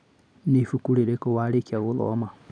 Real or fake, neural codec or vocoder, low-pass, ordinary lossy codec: real; none; 9.9 kHz; none